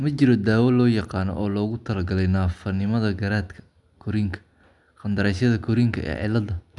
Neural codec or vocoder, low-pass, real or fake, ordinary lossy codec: none; 10.8 kHz; real; none